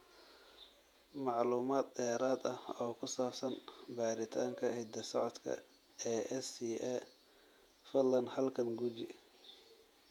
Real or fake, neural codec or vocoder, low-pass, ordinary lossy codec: fake; vocoder, 48 kHz, 128 mel bands, Vocos; 19.8 kHz; MP3, 96 kbps